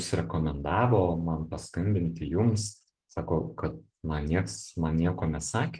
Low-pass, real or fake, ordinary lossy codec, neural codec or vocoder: 9.9 kHz; real; Opus, 16 kbps; none